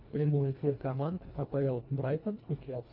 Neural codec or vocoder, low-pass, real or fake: codec, 24 kHz, 1.5 kbps, HILCodec; 5.4 kHz; fake